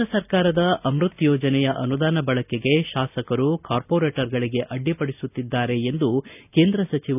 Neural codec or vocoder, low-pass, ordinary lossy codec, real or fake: none; 3.6 kHz; none; real